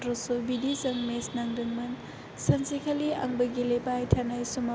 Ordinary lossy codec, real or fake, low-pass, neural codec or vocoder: none; real; none; none